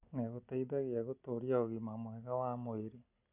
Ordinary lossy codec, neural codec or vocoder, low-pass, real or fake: none; none; 3.6 kHz; real